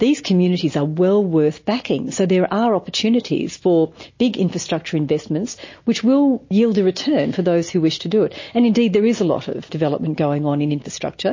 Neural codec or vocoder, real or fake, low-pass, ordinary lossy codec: none; real; 7.2 kHz; MP3, 32 kbps